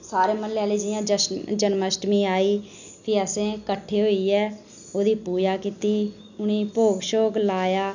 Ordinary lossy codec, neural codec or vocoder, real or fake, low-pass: none; none; real; 7.2 kHz